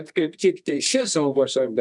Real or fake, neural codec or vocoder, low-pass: fake; codec, 24 kHz, 0.9 kbps, WavTokenizer, medium music audio release; 10.8 kHz